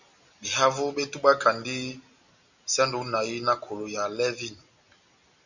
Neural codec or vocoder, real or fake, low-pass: none; real; 7.2 kHz